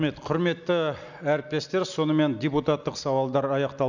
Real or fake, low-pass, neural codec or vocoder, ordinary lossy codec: real; 7.2 kHz; none; none